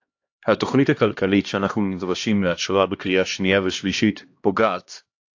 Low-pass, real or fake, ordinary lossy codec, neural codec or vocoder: 7.2 kHz; fake; AAC, 48 kbps; codec, 16 kHz, 1 kbps, X-Codec, HuBERT features, trained on LibriSpeech